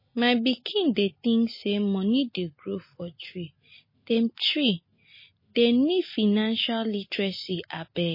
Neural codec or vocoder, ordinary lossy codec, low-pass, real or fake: none; MP3, 24 kbps; 5.4 kHz; real